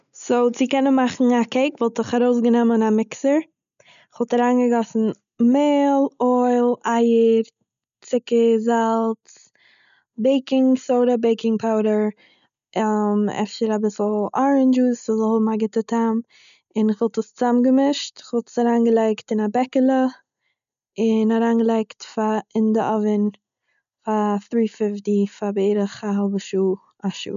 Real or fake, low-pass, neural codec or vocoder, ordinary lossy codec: real; 7.2 kHz; none; none